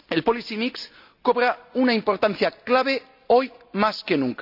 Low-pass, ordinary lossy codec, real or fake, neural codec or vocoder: 5.4 kHz; none; real; none